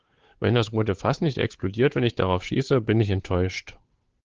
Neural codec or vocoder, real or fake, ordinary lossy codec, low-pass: codec, 16 kHz, 8 kbps, FunCodec, trained on Chinese and English, 25 frames a second; fake; Opus, 16 kbps; 7.2 kHz